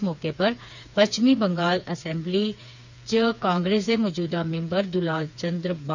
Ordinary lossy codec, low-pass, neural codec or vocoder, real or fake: none; 7.2 kHz; codec, 16 kHz, 4 kbps, FreqCodec, smaller model; fake